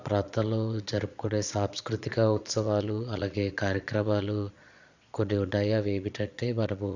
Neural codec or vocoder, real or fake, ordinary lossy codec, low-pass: vocoder, 22.05 kHz, 80 mel bands, Vocos; fake; none; 7.2 kHz